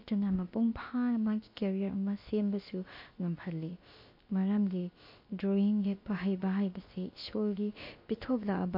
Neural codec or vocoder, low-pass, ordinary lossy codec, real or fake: codec, 16 kHz, about 1 kbps, DyCAST, with the encoder's durations; 5.4 kHz; MP3, 32 kbps; fake